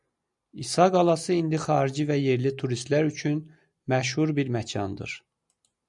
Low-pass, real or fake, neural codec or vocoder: 10.8 kHz; real; none